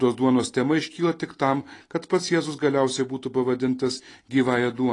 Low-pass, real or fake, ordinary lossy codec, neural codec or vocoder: 10.8 kHz; real; AAC, 32 kbps; none